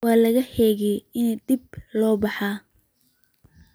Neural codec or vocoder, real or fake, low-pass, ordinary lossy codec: none; real; none; none